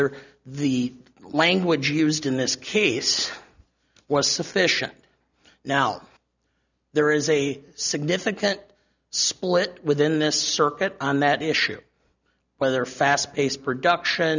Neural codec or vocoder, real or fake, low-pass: none; real; 7.2 kHz